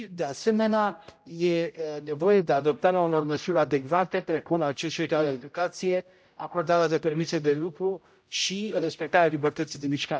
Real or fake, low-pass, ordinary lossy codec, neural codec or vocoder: fake; none; none; codec, 16 kHz, 0.5 kbps, X-Codec, HuBERT features, trained on general audio